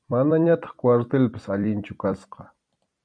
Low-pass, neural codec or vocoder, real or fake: 9.9 kHz; vocoder, 44.1 kHz, 128 mel bands every 256 samples, BigVGAN v2; fake